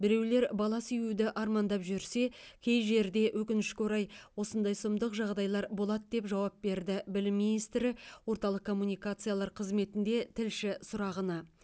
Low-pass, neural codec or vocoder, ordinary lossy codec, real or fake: none; none; none; real